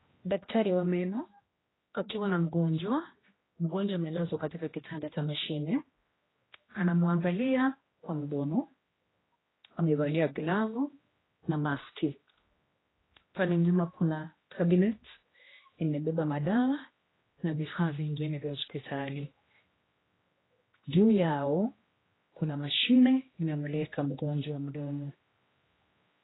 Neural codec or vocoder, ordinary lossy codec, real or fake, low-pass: codec, 16 kHz, 1 kbps, X-Codec, HuBERT features, trained on general audio; AAC, 16 kbps; fake; 7.2 kHz